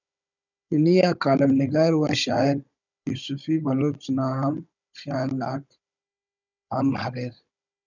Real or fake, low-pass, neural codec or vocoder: fake; 7.2 kHz; codec, 16 kHz, 16 kbps, FunCodec, trained on Chinese and English, 50 frames a second